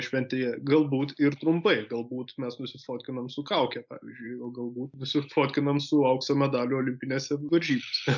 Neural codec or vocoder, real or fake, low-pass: none; real; 7.2 kHz